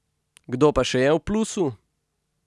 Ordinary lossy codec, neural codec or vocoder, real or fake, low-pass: none; none; real; none